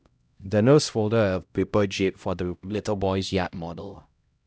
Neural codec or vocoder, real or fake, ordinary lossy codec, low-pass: codec, 16 kHz, 0.5 kbps, X-Codec, HuBERT features, trained on LibriSpeech; fake; none; none